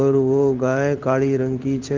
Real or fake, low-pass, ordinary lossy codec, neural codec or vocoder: fake; 7.2 kHz; Opus, 24 kbps; codec, 16 kHz in and 24 kHz out, 1 kbps, XY-Tokenizer